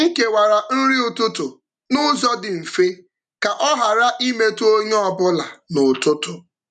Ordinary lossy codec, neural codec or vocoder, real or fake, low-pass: none; none; real; 10.8 kHz